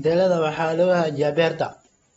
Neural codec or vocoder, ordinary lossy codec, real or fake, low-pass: none; AAC, 24 kbps; real; 19.8 kHz